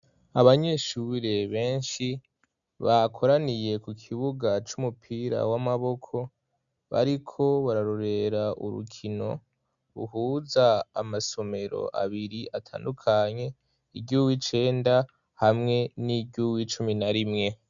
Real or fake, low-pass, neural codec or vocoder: real; 7.2 kHz; none